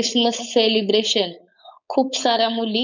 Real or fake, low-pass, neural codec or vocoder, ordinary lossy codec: fake; 7.2 kHz; codec, 16 kHz, 4.8 kbps, FACodec; none